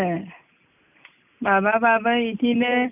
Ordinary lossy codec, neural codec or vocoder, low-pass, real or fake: none; none; 3.6 kHz; real